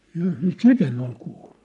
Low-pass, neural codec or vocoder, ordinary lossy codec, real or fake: 10.8 kHz; codec, 44.1 kHz, 3.4 kbps, Pupu-Codec; none; fake